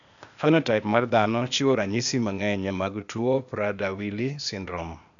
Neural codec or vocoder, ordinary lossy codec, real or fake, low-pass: codec, 16 kHz, 0.8 kbps, ZipCodec; MP3, 96 kbps; fake; 7.2 kHz